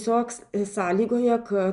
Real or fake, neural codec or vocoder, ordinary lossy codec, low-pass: real; none; MP3, 96 kbps; 10.8 kHz